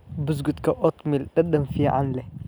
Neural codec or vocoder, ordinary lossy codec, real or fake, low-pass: none; none; real; none